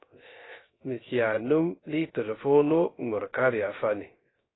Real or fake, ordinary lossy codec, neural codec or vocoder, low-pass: fake; AAC, 16 kbps; codec, 16 kHz, 0.3 kbps, FocalCodec; 7.2 kHz